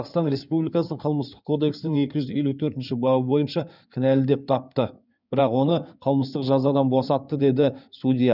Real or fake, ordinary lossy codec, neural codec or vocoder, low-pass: fake; none; codec, 16 kHz in and 24 kHz out, 2.2 kbps, FireRedTTS-2 codec; 5.4 kHz